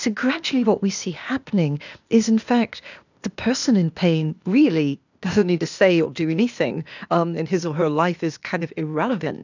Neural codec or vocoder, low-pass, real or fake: codec, 16 kHz, 0.8 kbps, ZipCodec; 7.2 kHz; fake